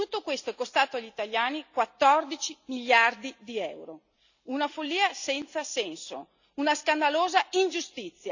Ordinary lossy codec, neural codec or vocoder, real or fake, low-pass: none; none; real; 7.2 kHz